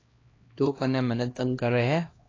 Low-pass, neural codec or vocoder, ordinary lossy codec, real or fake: 7.2 kHz; codec, 16 kHz, 1 kbps, X-Codec, HuBERT features, trained on LibriSpeech; AAC, 32 kbps; fake